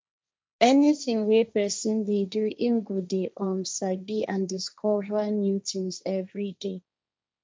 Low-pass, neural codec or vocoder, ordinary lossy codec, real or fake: none; codec, 16 kHz, 1.1 kbps, Voila-Tokenizer; none; fake